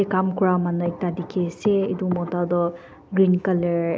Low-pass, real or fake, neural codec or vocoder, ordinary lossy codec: none; real; none; none